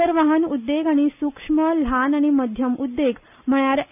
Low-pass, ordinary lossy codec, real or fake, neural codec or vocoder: 3.6 kHz; none; real; none